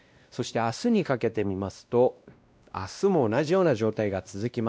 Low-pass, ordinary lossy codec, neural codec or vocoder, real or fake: none; none; codec, 16 kHz, 1 kbps, X-Codec, WavLM features, trained on Multilingual LibriSpeech; fake